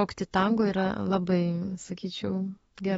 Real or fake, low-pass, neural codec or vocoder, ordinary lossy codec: fake; 19.8 kHz; autoencoder, 48 kHz, 32 numbers a frame, DAC-VAE, trained on Japanese speech; AAC, 24 kbps